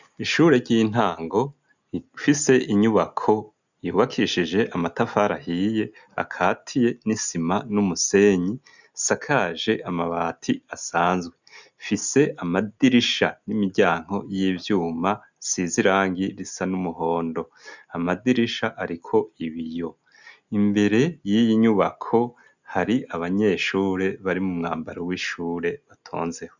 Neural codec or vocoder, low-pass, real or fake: none; 7.2 kHz; real